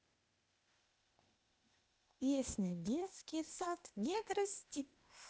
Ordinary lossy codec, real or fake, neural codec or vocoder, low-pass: none; fake; codec, 16 kHz, 0.8 kbps, ZipCodec; none